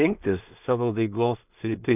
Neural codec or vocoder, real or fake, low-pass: codec, 16 kHz in and 24 kHz out, 0.4 kbps, LongCat-Audio-Codec, two codebook decoder; fake; 3.6 kHz